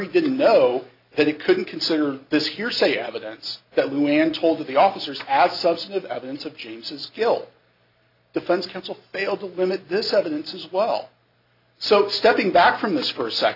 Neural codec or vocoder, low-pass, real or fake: none; 5.4 kHz; real